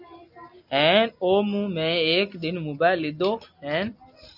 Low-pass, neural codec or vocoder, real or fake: 5.4 kHz; none; real